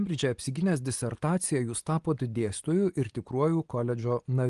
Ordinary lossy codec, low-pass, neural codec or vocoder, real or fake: Opus, 24 kbps; 10.8 kHz; none; real